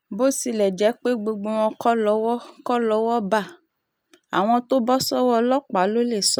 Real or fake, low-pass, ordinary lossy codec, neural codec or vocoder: real; none; none; none